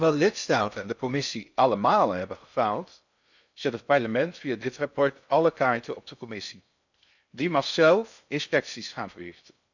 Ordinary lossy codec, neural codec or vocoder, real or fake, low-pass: none; codec, 16 kHz in and 24 kHz out, 0.6 kbps, FocalCodec, streaming, 4096 codes; fake; 7.2 kHz